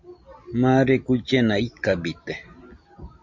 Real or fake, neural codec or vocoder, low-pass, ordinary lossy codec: real; none; 7.2 kHz; MP3, 64 kbps